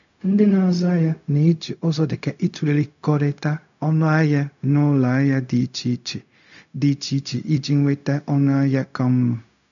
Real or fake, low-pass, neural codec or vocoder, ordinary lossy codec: fake; 7.2 kHz; codec, 16 kHz, 0.4 kbps, LongCat-Audio-Codec; none